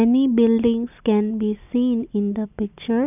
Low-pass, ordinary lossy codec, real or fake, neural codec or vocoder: 3.6 kHz; none; real; none